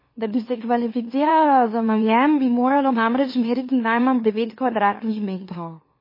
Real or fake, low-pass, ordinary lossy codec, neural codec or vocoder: fake; 5.4 kHz; MP3, 24 kbps; autoencoder, 44.1 kHz, a latent of 192 numbers a frame, MeloTTS